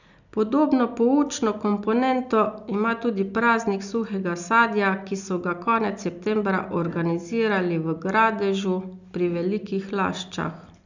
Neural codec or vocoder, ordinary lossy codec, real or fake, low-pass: none; none; real; 7.2 kHz